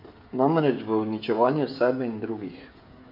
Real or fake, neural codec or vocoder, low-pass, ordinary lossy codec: fake; codec, 16 kHz, 16 kbps, FreqCodec, smaller model; 5.4 kHz; MP3, 32 kbps